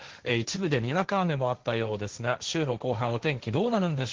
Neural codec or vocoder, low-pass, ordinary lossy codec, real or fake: codec, 16 kHz, 1.1 kbps, Voila-Tokenizer; 7.2 kHz; Opus, 16 kbps; fake